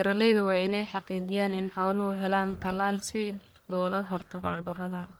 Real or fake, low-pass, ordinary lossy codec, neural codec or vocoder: fake; none; none; codec, 44.1 kHz, 1.7 kbps, Pupu-Codec